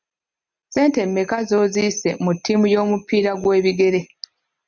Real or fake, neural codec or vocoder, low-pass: real; none; 7.2 kHz